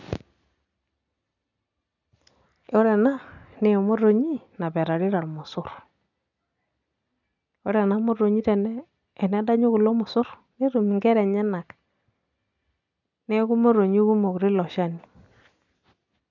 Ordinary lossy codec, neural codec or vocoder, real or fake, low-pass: none; none; real; 7.2 kHz